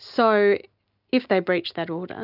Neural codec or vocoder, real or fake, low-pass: none; real; 5.4 kHz